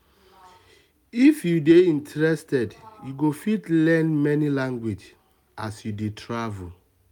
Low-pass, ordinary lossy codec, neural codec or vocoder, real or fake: 19.8 kHz; none; none; real